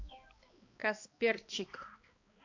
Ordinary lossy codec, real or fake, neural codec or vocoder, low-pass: AAC, 48 kbps; fake; codec, 16 kHz, 2 kbps, X-Codec, HuBERT features, trained on balanced general audio; 7.2 kHz